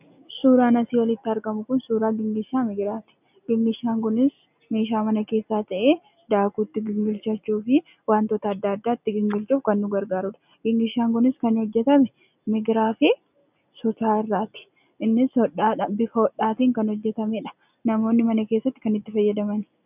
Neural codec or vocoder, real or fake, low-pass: none; real; 3.6 kHz